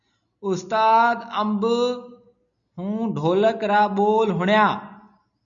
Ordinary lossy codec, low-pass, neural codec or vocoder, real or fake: MP3, 96 kbps; 7.2 kHz; none; real